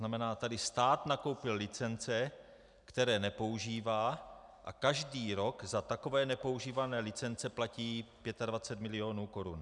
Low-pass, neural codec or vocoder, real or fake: 10.8 kHz; none; real